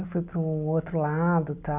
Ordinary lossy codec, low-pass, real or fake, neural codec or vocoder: none; 3.6 kHz; real; none